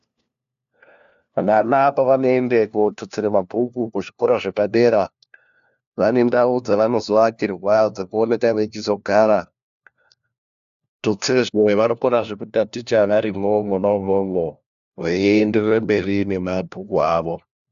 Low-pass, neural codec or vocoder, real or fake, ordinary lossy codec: 7.2 kHz; codec, 16 kHz, 1 kbps, FunCodec, trained on LibriTTS, 50 frames a second; fake; AAC, 96 kbps